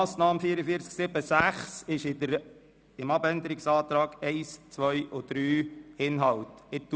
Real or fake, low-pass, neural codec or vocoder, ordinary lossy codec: real; none; none; none